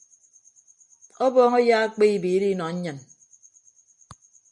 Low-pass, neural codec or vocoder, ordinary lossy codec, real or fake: 9.9 kHz; none; MP3, 64 kbps; real